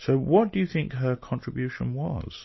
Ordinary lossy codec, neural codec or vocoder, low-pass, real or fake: MP3, 24 kbps; none; 7.2 kHz; real